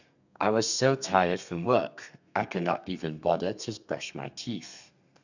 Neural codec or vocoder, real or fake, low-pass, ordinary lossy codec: codec, 32 kHz, 1.9 kbps, SNAC; fake; 7.2 kHz; none